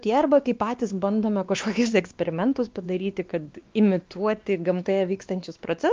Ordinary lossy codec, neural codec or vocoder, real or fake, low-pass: Opus, 24 kbps; codec, 16 kHz, 2 kbps, X-Codec, WavLM features, trained on Multilingual LibriSpeech; fake; 7.2 kHz